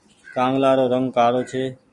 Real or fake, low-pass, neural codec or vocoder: real; 10.8 kHz; none